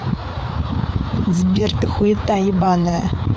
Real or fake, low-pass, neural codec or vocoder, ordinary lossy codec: fake; none; codec, 16 kHz, 4 kbps, FreqCodec, larger model; none